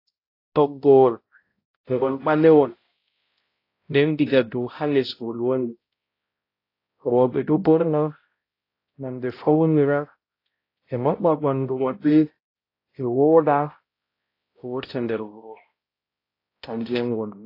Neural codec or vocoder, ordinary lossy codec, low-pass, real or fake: codec, 16 kHz, 0.5 kbps, X-Codec, HuBERT features, trained on balanced general audio; AAC, 32 kbps; 5.4 kHz; fake